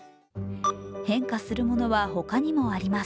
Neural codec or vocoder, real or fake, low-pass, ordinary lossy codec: none; real; none; none